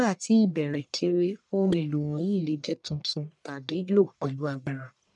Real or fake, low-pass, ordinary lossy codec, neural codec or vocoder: fake; 10.8 kHz; none; codec, 44.1 kHz, 1.7 kbps, Pupu-Codec